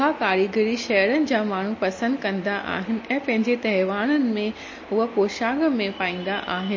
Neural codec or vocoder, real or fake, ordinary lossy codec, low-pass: none; real; MP3, 32 kbps; 7.2 kHz